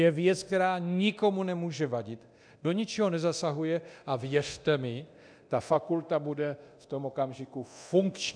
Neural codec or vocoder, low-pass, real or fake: codec, 24 kHz, 0.9 kbps, DualCodec; 9.9 kHz; fake